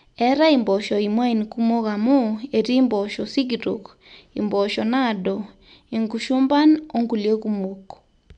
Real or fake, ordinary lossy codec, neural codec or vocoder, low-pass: real; none; none; 9.9 kHz